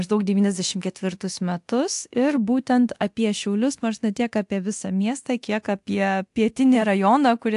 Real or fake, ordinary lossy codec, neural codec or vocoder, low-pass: fake; AAC, 64 kbps; codec, 24 kHz, 0.9 kbps, DualCodec; 10.8 kHz